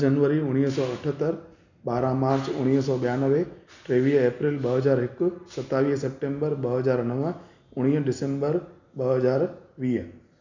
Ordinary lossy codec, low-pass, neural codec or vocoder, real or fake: none; 7.2 kHz; none; real